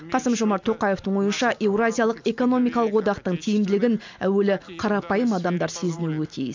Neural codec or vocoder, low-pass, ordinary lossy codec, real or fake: none; 7.2 kHz; MP3, 64 kbps; real